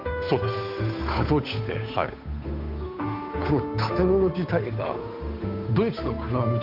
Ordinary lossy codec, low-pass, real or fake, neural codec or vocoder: none; 5.4 kHz; fake; codec, 16 kHz, 8 kbps, FunCodec, trained on Chinese and English, 25 frames a second